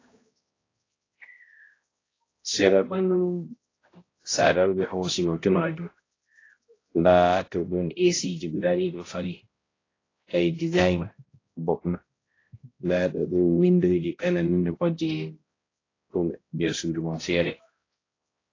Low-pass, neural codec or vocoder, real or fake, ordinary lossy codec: 7.2 kHz; codec, 16 kHz, 0.5 kbps, X-Codec, HuBERT features, trained on balanced general audio; fake; AAC, 32 kbps